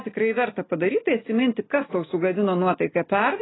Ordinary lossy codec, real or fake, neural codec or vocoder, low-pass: AAC, 16 kbps; real; none; 7.2 kHz